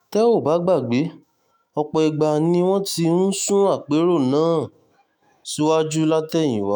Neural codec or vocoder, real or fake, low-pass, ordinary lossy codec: autoencoder, 48 kHz, 128 numbers a frame, DAC-VAE, trained on Japanese speech; fake; none; none